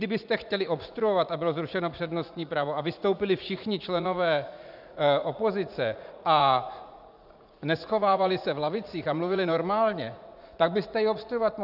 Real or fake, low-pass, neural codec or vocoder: fake; 5.4 kHz; vocoder, 44.1 kHz, 80 mel bands, Vocos